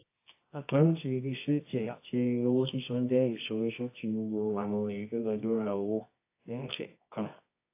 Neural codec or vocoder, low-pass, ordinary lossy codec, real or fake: codec, 24 kHz, 0.9 kbps, WavTokenizer, medium music audio release; 3.6 kHz; AAC, 24 kbps; fake